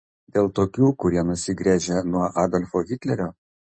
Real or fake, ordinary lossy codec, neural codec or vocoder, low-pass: real; MP3, 32 kbps; none; 9.9 kHz